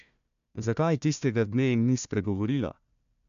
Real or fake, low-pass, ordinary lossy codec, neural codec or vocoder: fake; 7.2 kHz; none; codec, 16 kHz, 1 kbps, FunCodec, trained on Chinese and English, 50 frames a second